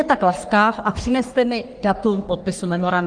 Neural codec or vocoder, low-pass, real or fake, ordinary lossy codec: codec, 44.1 kHz, 3.4 kbps, Pupu-Codec; 9.9 kHz; fake; Opus, 24 kbps